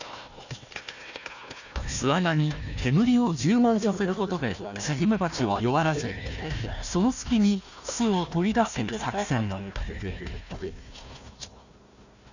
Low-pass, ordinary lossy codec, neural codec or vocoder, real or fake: 7.2 kHz; none; codec, 16 kHz, 1 kbps, FunCodec, trained on Chinese and English, 50 frames a second; fake